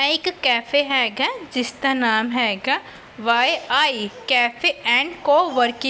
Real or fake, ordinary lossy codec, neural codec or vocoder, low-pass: real; none; none; none